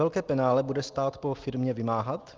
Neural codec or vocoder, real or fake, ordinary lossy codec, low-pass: none; real; Opus, 24 kbps; 7.2 kHz